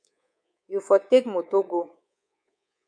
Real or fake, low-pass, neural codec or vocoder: fake; 9.9 kHz; codec, 24 kHz, 3.1 kbps, DualCodec